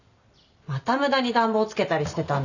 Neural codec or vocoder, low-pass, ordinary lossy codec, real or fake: none; 7.2 kHz; none; real